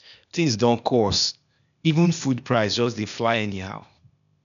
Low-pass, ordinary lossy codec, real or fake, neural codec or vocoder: 7.2 kHz; none; fake; codec, 16 kHz, 0.8 kbps, ZipCodec